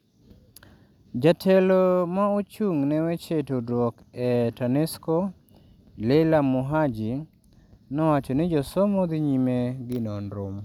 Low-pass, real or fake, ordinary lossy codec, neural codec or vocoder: 19.8 kHz; real; none; none